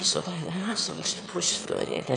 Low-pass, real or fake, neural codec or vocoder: 9.9 kHz; fake; autoencoder, 22.05 kHz, a latent of 192 numbers a frame, VITS, trained on one speaker